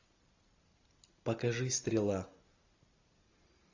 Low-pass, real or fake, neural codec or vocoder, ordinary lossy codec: 7.2 kHz; real; none; AAC, 48 kbps